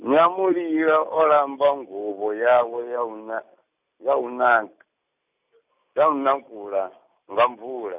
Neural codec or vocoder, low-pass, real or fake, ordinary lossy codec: none; 3.6 kHz; real; none